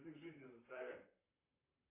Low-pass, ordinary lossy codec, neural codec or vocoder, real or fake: 3.6 kHz; AAC, 16 kbps; vocoder, 44.1 kHz, 128 mel bands, Pupu-Vocoder; fake